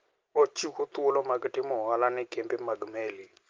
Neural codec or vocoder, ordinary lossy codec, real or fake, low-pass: none; Opus, 24 kbps; real; 7.2 kHz